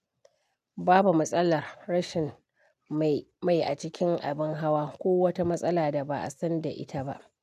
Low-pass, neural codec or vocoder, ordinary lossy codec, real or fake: 14.4 kHz; none; none; real